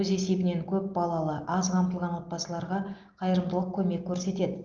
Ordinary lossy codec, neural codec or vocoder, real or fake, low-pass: Opus, 32 kbps; none; real; 7.2 kHz